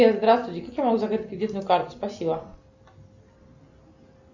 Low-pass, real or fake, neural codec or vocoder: 7.2 kHz; real; none